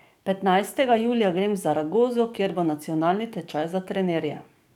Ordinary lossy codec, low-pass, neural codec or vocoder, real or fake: none; 19.8 kHz; codec, 44.1 kHz, 7.8 kbps, DAC; fake